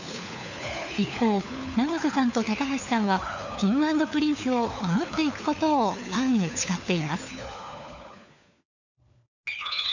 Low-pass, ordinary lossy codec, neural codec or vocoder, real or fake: 7.2 kHz; none; codec, 16 kHz, 4 kbps, FunCodec, trained on LibriTTS, 50 frames a second; fake